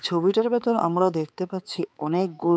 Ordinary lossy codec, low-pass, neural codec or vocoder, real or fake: none; none; codec, 16 kHz, 4 kbps, X-Codec, HuBERT features, trained on balanced general audio; fake